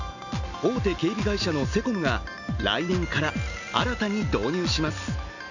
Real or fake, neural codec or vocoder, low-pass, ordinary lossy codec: real; none; 7.2 kHz; none